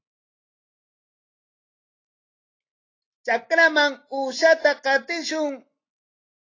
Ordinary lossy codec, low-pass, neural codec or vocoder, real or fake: AAC, 32 kbps; 7.2 kHz; none; real